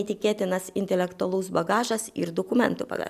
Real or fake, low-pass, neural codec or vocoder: real; 14.4 kHz; none